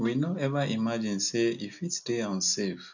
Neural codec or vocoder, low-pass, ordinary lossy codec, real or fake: none; 7.2 kHz; none; real